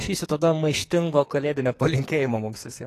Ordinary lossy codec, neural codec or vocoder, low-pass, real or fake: AAC, 48 kbps; codec, 44.1 kHz, 2.6 kbps, SNAC; 14.4 kHz; fake